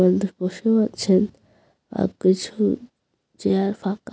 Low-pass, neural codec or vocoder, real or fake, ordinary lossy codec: none; none; real; none